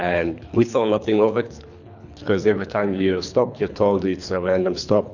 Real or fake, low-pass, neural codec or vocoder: fake; 7.2 kHz; codec, 24 kHz, 3 kbps, HILCodec